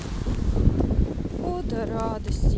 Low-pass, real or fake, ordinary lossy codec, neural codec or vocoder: none; real; none; none